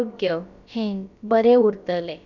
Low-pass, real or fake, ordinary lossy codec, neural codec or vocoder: 7.2 kHz; fake; none; codec, 16 kHz, about 1 kbps, DyCAST, with the encoder's durations